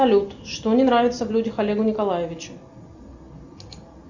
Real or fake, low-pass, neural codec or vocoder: real; 7.2 kHz; none